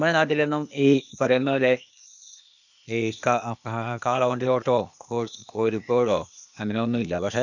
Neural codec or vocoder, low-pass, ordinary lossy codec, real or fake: codec, 16 kHz, 0.8 kbps, ZipCodec; 7.2 kHz; none; fake